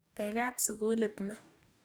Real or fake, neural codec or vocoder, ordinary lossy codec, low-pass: fake; codec, 44.1 kHz, 2.6 kbps, DAC; none; none